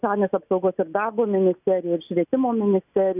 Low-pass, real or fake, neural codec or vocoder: 3.6 kHz; real; none